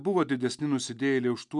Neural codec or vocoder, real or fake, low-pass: none; real; 10.8 kHz